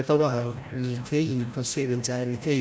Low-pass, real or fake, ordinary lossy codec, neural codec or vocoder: none; fake; none; codec, 16 kHz, 0.5 kbps, FreqCodec, larger model